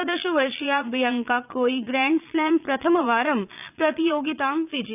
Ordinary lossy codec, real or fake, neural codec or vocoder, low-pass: none; fake; vocoder, 22.05 kHz, 80 mel bands, Vocos; 3.6 kHz